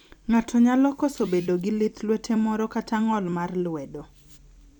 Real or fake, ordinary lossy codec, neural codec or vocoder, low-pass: fake; none; vocoder, 44.1 kHz, 128 mel bands every 256 samples, BigVGAN v2; 19.8 kHz